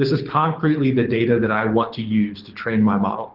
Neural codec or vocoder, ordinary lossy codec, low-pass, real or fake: codec, 24 kHz, 6 kbps, HILCodec; Opus, 16 kbps; 5.4 kHz; fake